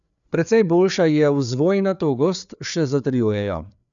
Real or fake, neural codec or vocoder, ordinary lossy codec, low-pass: fake; codec, 16 kHz, 4 kbps, FreqCodec, larger model; none; 7.2 kHz